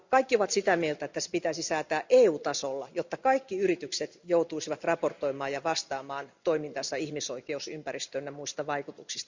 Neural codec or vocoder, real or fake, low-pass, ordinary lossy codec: none; real; 7.2 kHz; Opus, 64 kbps